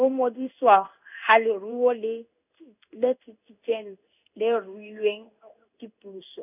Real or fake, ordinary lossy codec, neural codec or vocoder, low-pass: fake; none; codec, 16 kHz in and 24 kHz out, 1 kbps, XY-Tokenizer; 3.6 kHz